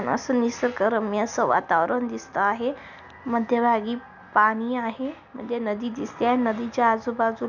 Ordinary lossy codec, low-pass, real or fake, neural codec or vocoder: none; 7.2 kHz; real; none